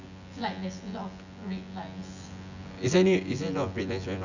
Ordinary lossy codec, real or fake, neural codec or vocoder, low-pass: none; fake; vocoder, 24 kHz, 100 mel bands, Vocos; 7.2 kHz